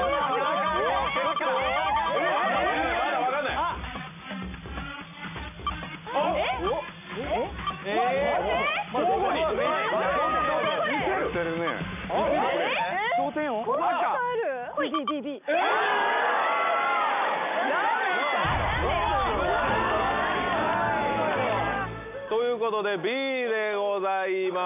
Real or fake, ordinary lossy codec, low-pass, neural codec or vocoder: real; none; 3.6 kHz; none